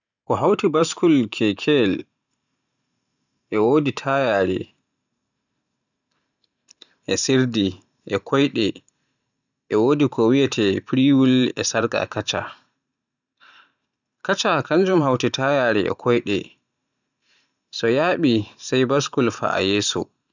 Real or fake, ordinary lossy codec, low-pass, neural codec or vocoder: fake; none; 7.2 kHz; vocoder, 44.1 kHz, 128 mel bands every 512 samples, BigVGAN v2